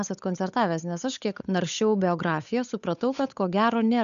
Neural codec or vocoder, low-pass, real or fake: codec, 16 kHz, 8 kbps, FunCodec, trained on Chinese and English, 25 frames a second; 7.2 kHz; fake